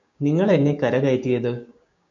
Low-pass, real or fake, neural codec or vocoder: 7.2 kHz; fake; codec, 16 kHz, 6 kbps, DAC